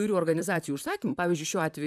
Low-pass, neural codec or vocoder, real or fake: 14.4 kHz; vocoder, 44.1 kHz, 128 mel bands, Pupu-Vocoder; fake